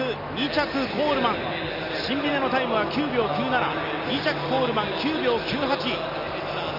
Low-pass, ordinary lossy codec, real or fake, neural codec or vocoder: 5.4 kHz; none; real; none